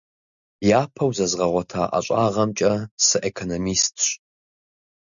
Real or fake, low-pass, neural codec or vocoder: real; 7.2 kHz; none